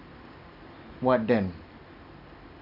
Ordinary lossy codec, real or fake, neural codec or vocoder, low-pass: none; real; none; 5.4 kHz